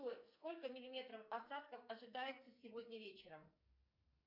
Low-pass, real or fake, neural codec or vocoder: 5.4 kHz; fake; codec, 44.1 kHz, 2.6 kbps, SNAC